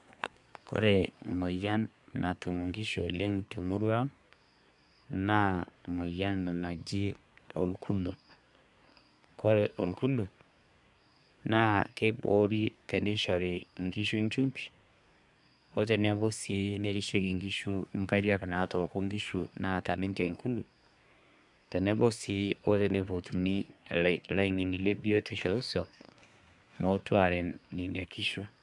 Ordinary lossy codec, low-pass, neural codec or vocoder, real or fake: none; 10.8 kHz; codec, 24 kHz, 1 kbps, SNAC; fake